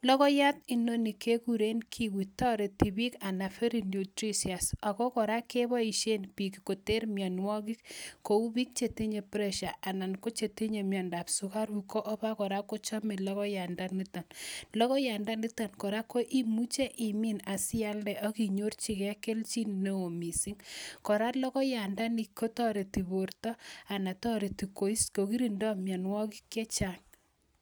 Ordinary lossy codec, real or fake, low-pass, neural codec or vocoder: none; real; none; none